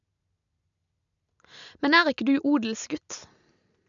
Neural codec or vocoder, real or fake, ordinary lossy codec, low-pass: none; real; none; 7.2 kHz